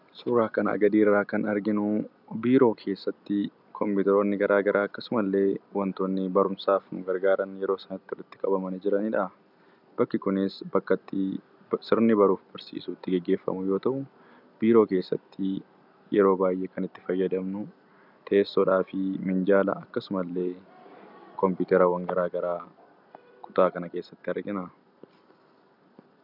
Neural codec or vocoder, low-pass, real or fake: none; 5.4 kHz; real